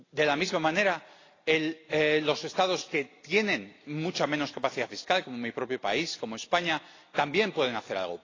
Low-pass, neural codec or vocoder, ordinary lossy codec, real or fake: 7.2 kHz; none; AAC, 32 kbps; real